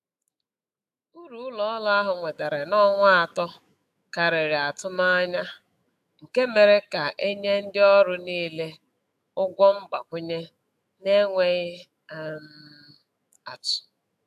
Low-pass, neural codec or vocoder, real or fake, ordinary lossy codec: 14.4 kHz; autoencoder, 48 kHz, 128 numbers a frame, DAC-VAE, trained on Japanese speech; fake; AAC, 96 kbps